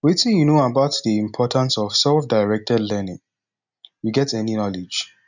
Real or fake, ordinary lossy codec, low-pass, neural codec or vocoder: real; none; 7.2 kHz; none